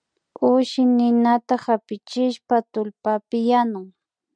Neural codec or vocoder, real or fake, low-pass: none; real; 9.9 kHz